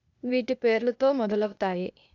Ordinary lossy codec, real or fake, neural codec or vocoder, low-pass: none; fake; codec, 16 kHz, 0.8 kbps, ZipCodec; 7.2 kHz